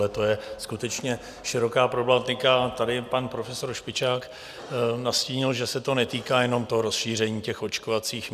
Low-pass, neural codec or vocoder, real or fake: 14.4 kHz; none; real